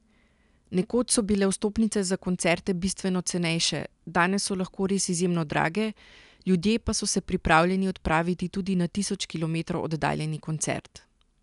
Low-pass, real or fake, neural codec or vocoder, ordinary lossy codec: 10.8 kHz; real; none; none